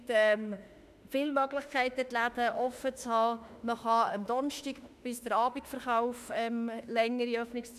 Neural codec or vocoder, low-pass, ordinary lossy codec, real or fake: autoencoder, 48 kHz, 32 numbers a frame, DAC-VAE, trained on Japanese speech; 14.4 kHz; none; fake